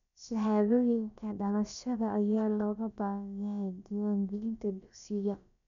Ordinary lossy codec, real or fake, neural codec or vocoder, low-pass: none; fake; codec, 16 kHz, about 1 kbps, DyCAST, with the encoder's durations; 7.2 kHz